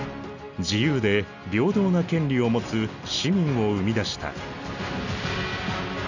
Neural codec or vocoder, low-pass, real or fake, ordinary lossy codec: none; 7.2 kHz; real; none